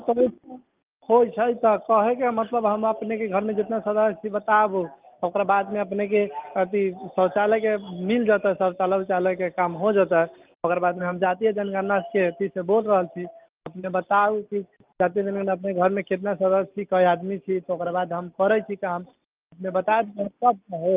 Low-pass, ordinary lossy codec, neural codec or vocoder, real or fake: 3.6 kHz; Opus, 32 kbps; none; real